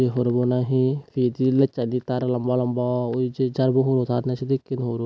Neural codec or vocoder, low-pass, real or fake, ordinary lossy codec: none; none; real; none